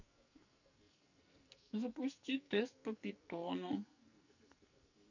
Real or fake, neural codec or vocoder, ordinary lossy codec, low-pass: fake; codec, 44.1 kHz, 2.6 kbps, SNAC; none; 7.2 kHz